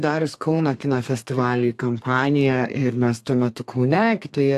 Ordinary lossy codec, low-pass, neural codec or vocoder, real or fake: AAC, 64 kbps; 14.4 kHz; codec, 32 kHz, 1.9 kbps, SNAC; fake